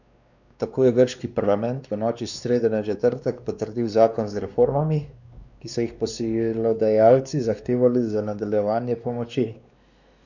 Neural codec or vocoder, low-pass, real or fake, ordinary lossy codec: codec, 16 kHz, 2 kbps, X-Codec, WavLM features, trained on Multilingual LibriSpeech; 7.2 kHz; fake; none